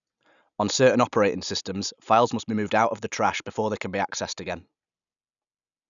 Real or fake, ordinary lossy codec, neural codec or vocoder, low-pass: real; none; none; 7.2 kHz